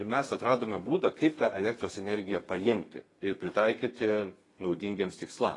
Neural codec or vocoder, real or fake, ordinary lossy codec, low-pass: codec, 32 kHz, 1.9 kbps, SNAC; fake; AAC, 32 kbps; 10.8 kHz